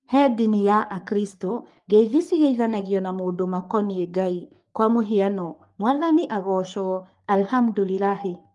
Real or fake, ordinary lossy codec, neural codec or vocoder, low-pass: fake; Opus, 32 kbps; codec, 44.1 kHz, 3.4 kbps, Pupu-Codec; 10.8 kHz